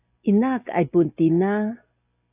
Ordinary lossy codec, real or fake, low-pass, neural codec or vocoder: AAC, 24 kbps; real; 3.6 kHz; none